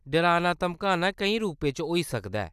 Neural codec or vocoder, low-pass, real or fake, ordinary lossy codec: none; 14.4 kHz; real; none